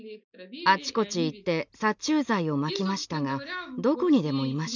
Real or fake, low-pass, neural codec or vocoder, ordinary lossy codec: real; 7.2 kHz; none; none